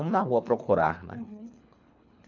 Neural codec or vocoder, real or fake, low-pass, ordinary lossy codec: codec, 24 kHz, 3 kbps, HILCodec; fake; 7.2 kHz; none